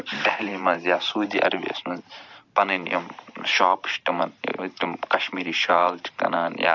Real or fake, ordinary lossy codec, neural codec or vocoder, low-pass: fake; none; vocoder, 44.1 kHz, 128 mel bands every 512 samples, BigVGAN v2; 7.2 kHz